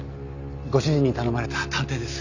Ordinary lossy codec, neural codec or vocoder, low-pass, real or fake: none; none; 7.2 kHz; real